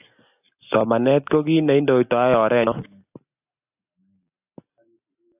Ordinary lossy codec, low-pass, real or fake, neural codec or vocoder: AAC, 32 kbps; 3.6 kHz; real; none